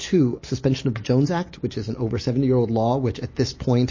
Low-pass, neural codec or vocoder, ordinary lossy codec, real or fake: 7.2 kHz; none; MP3, 32 kbps; real